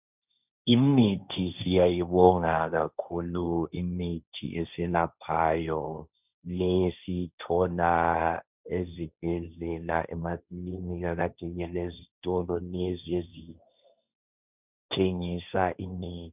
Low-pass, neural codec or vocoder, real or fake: 3.6 kHz; codec, 16 kHz, 1.1 kbps, Voila-Tokenizer; fake